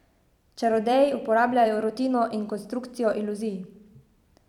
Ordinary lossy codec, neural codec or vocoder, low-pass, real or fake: none; vocoder, 44.1 kHz, 128 mel bands every 256 samples, BigVGAN v2; 19.8 kHz; fake